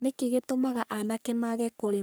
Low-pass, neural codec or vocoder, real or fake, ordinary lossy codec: none; codec, 44.1 kHz, 3.4 kbps, Pupu-Codec; fake; none